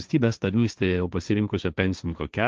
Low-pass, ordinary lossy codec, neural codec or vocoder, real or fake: 7.2 kHz; Opus, 24 kbps; codec, 16 kHz, 1.1 kbps, Voila-Tokenizer; fake